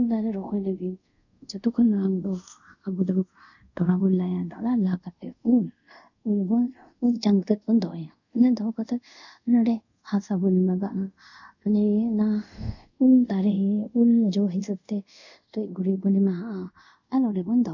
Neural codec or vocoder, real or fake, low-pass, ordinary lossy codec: codec, 24 kHz, 0.5 kbps, DualCodec; fake; 7.2 kHz; none